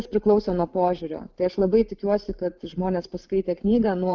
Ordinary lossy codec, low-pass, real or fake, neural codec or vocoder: Opus, 24 kbps; 7.2 kHz; fake; vocoder, 44.1 kHz, 128 mel bands every 512 samples, BigVGAN v2